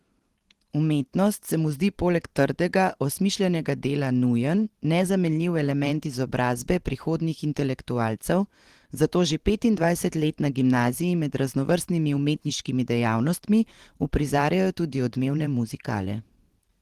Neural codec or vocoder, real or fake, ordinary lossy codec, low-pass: vocoder, 44.1 kHz, 128 mel bands every 512 samples, BigVGAN v2; fake; Opus, 16 kbps; 14.4 kHz